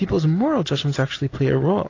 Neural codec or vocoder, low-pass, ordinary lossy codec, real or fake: none; 7.2 kHz; AAC, 32 kbps; real